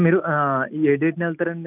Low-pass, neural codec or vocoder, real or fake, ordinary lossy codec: 3.6 kHz; none; real; none